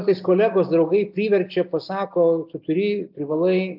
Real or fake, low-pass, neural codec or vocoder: real; 5.4 kHz; none